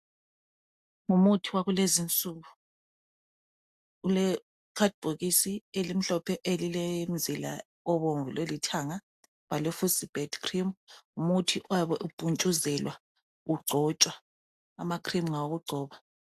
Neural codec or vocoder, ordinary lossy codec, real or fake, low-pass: none; AAC, 96 kbps; real; 14.4 kHz